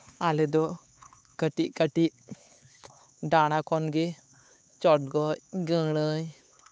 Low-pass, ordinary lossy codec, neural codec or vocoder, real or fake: none; none; codec, 16 kHz, 4 kbps, X-Codec, HuBERT features, trained on LibriSpeech; fake